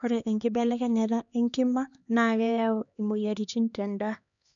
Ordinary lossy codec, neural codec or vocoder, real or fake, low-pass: none; codec, 16 kHz, 2 kbps, X-Codec, HuBERT features, trained on LibriSpeech; fake; 7.2 kHz